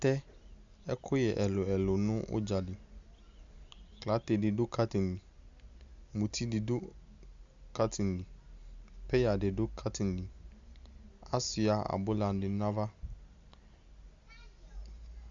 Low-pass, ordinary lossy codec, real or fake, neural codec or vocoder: 7.2 kHz; Opus, 64 kbps; real; none